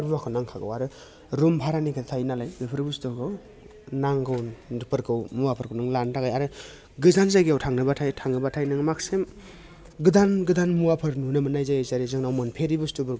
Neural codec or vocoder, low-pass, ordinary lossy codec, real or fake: none; none; none; real